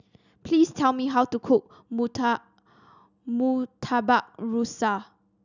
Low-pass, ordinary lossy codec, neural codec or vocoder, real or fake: 7.2 kHz; none; none; real